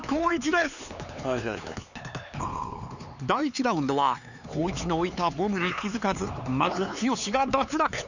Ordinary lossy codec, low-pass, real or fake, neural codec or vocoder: none; 7.2 kHz; fake; codec, 16 kHz, 4 kbps, X-Codec, HuBERT features, trained on LibriSpeech